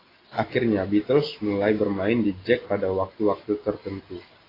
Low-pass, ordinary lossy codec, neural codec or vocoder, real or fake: 5.4 kHz; AAC, 24 kbps; none; real